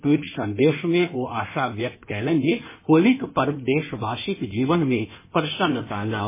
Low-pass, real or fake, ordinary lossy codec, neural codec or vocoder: 3.6 kHz; fake; MP3, 16 kbps; codec, 16 kHz in and 24 kHz out, 1.1 kbps, FireRedTTS-2 codec